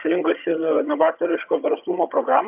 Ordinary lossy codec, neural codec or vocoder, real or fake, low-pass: AAC, 32 kbps; vocoder, 22.05 kHz, 80 mel bands, HiFi-GAN; fake; 3.6 kHz